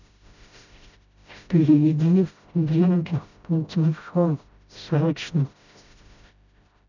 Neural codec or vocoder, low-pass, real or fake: codec, 16 kHz, 0.5 kbps, FreqCodec, smaller model; 7.2 kHz; fake